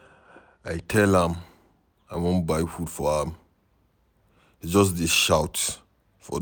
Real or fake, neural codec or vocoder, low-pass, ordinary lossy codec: real; none; none; none